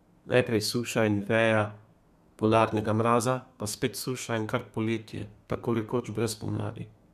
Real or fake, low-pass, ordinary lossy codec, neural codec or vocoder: fake; 14.4 kHz; none; codec, 32 kHz, 1.9 kbps, SNAC